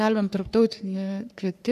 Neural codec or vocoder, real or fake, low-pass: codec, 44.1 kHz, 3.4 kbps, Pupu-Codec; fake; 14.4 kHz